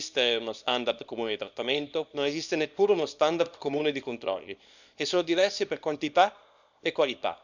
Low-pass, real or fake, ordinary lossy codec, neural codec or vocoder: 7.2 kHz; fake; none; codec, 24 kHz, 0.9 kbps, WavTokenizer, small release